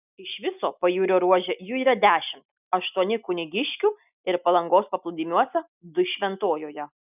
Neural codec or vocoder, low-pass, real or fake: none; 3.6 kHz; real